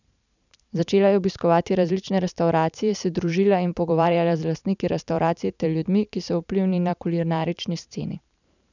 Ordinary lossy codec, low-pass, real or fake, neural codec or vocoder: none; 7.2 kHz; fake; vocoder, 44.1 kHz, 128 mel bands every 512 samples, BigVGAN v2